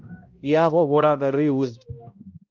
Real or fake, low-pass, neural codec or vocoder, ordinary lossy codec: fake; 7.2 kHz; codec, 16 kHz, 0.5 kbps, X-Codec, HuBERT features, trained on balanced general audio; Opus, 24 kbps